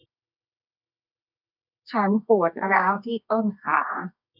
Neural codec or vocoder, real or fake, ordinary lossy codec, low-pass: codec, 24 kHz, 0.9 kbps, WavTokenizer, medium music audio release; fake; none; 5.4 kHz